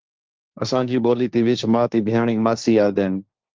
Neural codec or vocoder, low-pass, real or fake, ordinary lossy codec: codec, 16 kHz, 1.1 kbps, Voila-Tokenizer; 7.2 kHz; fake; Opus, 32 kbps